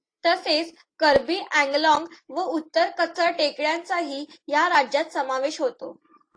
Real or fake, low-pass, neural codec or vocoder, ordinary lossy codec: real; 9.9 kHz; none; AAC, 48 kbps